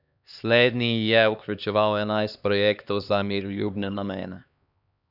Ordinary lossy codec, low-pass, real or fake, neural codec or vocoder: none; 5.4 kHz; fake; codec, 16 kHz, 2 kbps, X-Codec, HuBERT features, trained on LibriSpeech